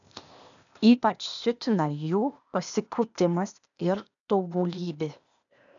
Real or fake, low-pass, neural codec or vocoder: fake; 7.2 kHz; codec, 16 kHz, 0.8 kbps, ZipCodec